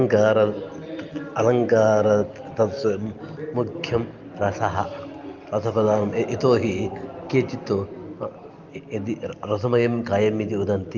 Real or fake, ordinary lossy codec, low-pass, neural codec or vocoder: real; Opus, 32 kbps; 7.2 kHz; none